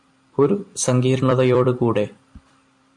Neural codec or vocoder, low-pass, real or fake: vocoder, 44.1 kHz, 128 mel bands every 256 samples, BigVGAN v2; 10.8 kHz; fake